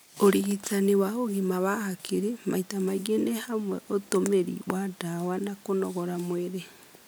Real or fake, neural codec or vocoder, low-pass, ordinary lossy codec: real; none; none; none